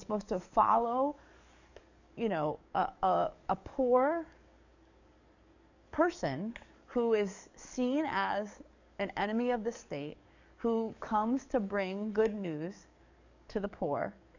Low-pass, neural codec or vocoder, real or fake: 7.2 kHz; codec, 44.1 kHz, 7.8 kbps, DAC; fake